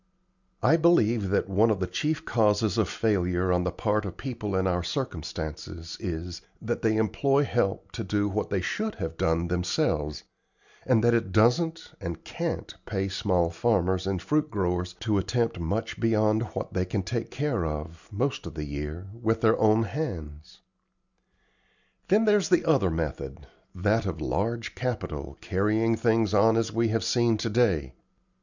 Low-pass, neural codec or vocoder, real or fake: 7.2 kHz; none; real